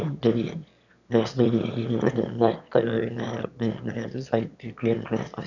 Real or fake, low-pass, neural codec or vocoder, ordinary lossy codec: fake; 7.2 kHz; autoencoder, 22.05 kHz, a latent of 192 numbers a frame, VITS, trained on one speaker; none